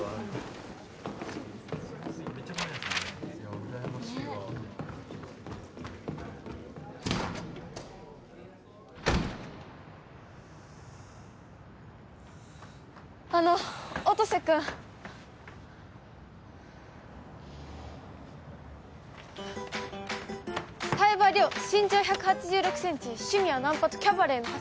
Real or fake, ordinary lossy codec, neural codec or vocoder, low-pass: real; none; none; none